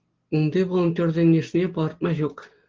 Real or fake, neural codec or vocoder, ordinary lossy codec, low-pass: real; none; Opus, 16 kbps; 7.2 kHz